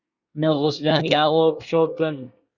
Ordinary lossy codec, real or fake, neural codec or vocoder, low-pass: Opus, 64 kbps; fake; codec, 24 kHz, 1 kbps, SNAC; 7.2 kHz